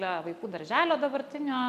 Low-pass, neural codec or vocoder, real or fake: 14.4 kHz; none; real